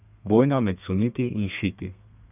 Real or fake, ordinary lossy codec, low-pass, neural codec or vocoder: fake; none; 3.6 kHz; codec, 32 kHz, 1.9 kbps, SNAC